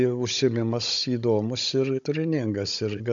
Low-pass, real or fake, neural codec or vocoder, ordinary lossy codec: 7.2 kHz; fake; codec, 16 kHz, 16 kbps, FunCodec, trained on Chinese and English, 50 frames a second; AAC, 64 kbps